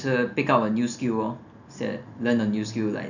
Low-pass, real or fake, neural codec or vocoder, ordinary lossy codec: 7.2 kHz; real; none; none